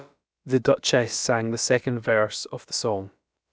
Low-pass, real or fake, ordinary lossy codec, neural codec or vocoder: none; fake; none; codec, 16 kHz, about 1 kbps, DyCAST, with the encoder's durations